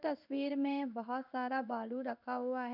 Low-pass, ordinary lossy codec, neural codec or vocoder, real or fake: 5.4 kHz; none; codec, 16 kHz in and 24 kHz out, 1 kbps, XY-Tokenizer; fake